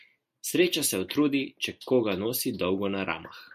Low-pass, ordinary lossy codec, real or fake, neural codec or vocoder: 14.4 kHz; MP3, 64 kbps; real; none